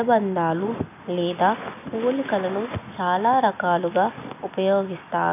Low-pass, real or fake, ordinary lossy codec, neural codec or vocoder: 3.6 kHz; real; none; none